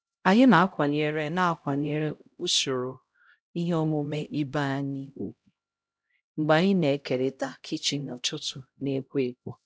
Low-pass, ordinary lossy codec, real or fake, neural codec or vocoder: none; none; fake; codec, 16 kHz, 0.5 kbps, X-Codec, HuBERT features, trained on LibriSpeech